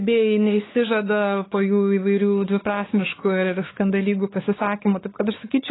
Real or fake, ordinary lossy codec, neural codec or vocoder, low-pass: fake; AAC, 16 kbps; codec, 16 kHz, 4 kbps, X-Codec, WavLM features, trained on Multilingual LibriSpeech; 7.2 kHz